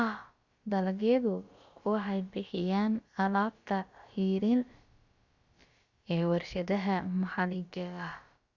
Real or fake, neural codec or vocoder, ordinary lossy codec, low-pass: fake; codec, 16 kHz, about 1 kbps, DyCAST, with the encoder's durations; Opus, 64 kbps; 7.2 kHz